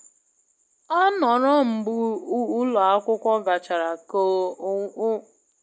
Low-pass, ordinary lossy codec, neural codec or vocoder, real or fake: none; none; none; real